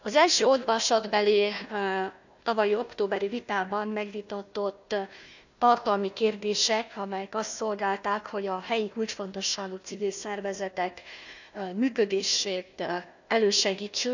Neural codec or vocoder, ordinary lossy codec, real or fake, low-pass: codec, 16 kHz, 1 kbps, FunCodec, trained on Chinese and English, 50 frames a second; none; fake; 7.2 kHz